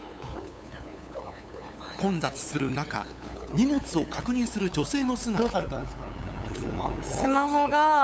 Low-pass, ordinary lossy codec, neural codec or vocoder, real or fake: none; none; codec, 16 kHz, 8 kbps, FunCodec, trained on LibriTTS, 25 frames a second; fake